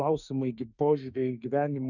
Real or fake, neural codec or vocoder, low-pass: fake; autoencoder, 48 kHz, 32 numbers a frame, DAC-VAE, trained on Japanese speech; 7.2 kHz